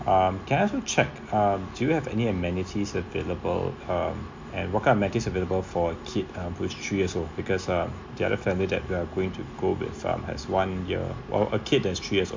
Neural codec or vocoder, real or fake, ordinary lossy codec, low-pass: none; real; MP3, 48 kbps; 7.2 kHz